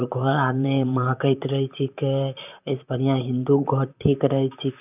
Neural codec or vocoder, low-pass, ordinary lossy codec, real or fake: vocoder, 44.1 kHz, 128 mel bands, Pupu-Vocoder; 3.6 kHz; none; fake